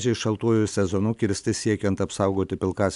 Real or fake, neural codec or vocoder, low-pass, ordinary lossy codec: real; none; 10.8 kHz; MP3, 96 kbps